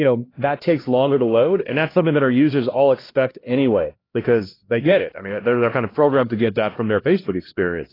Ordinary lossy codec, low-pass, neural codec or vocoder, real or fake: AAC, 24 kbps; 5.4 kHz; codec, 16 kHz, 1 kbps, X-Codec, HuBERT features, trained on LibriSpeech; fake